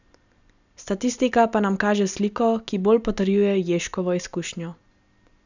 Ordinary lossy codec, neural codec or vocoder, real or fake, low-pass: none; none; real; 7.2 kHz